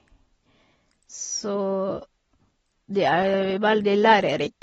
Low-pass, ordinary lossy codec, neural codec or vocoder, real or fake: 10.8 kHz; AAC, 24 kbps; none; real